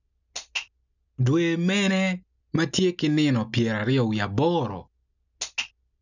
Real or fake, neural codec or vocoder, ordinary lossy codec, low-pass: real; none; none; 7.2 kHz